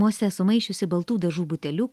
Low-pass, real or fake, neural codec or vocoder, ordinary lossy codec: 14.4 kHz; real; none; Opus, 24 kbps